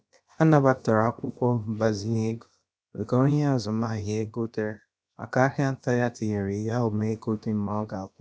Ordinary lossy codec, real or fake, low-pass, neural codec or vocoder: none; fake; none; codec, 16 kHz, about 1 kbps, DyCAST, with the encoder's durations